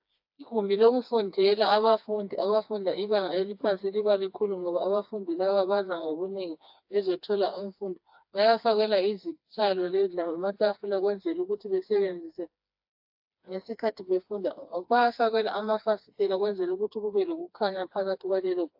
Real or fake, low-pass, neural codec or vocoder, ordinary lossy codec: fake; 5.4 kHz; codec, 16 kHz, 2 kbps, FreqCodec, smaller model; AAC, 48 kbps